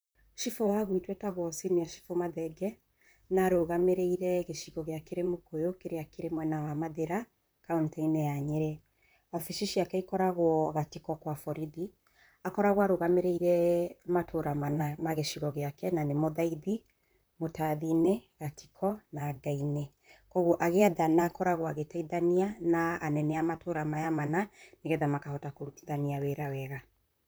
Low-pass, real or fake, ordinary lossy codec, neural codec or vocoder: none; fake; none; vocoder, 44.1 kHz, 128 mel bands, Pupu-Vocoder